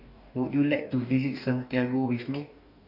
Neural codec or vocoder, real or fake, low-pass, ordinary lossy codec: codec, 44.1 kHz, 2.6 kbps, DAC; fake; 5.4 kHz; none